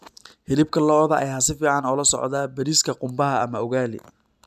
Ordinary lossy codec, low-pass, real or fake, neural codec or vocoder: none; 14.4 kHz; real; none